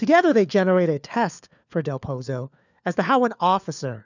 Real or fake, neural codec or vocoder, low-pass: fake; codec, 16 kHz, 4 kbps, FunCodec, trained on LibriTTS, 50 frames a second; 7.2 kHz